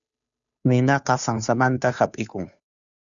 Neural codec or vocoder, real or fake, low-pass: codec, 16 kHz, 2 kbps, FunCodec, trained on Chinese and English, 25 frames a second; fake; 7.2 kHz